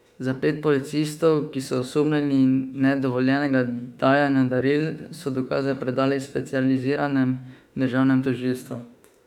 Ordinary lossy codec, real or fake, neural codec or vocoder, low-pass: none; fake; autoencoder, 48 kHz, 32 numbers a frame, DAC-VAE, trained on Japanese speech; 19.8 kHz